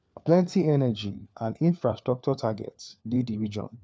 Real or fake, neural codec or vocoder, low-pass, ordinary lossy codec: fake; codec, 16 kHz, 4 kbps, FunCodec, trained on LibriTTS, 50 frames a second; none; none